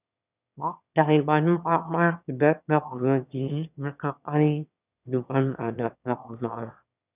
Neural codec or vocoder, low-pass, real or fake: autoencoder, 22.05 kHz, a latent of 192 numbers a frame, VITS, trained on one speaker; 3.6 kHz; fake